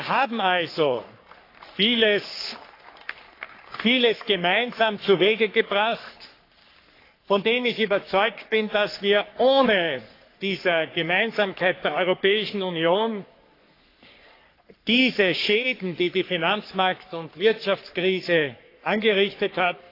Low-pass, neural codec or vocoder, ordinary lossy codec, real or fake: 5.4 kHz; codec, 44.1 kHz, 3.4 kbps, Pupu-Codec; AAC, 32 kbps; fake